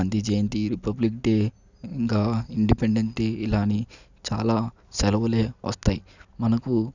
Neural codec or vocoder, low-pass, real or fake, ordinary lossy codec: none; 7.2 kHz; real; none